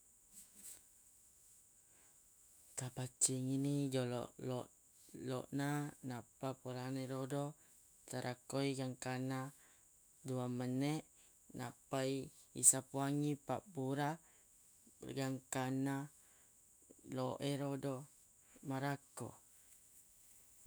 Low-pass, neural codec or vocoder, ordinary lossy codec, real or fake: none; autoencoder, 48 kHz, 128 numbers a frame, DAC-VAE, trained on Japanese speech; none; fake